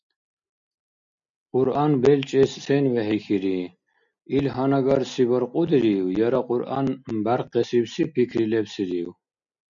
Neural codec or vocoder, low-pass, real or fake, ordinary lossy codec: none; 7.2 kHz; real; MP3, 96 kbps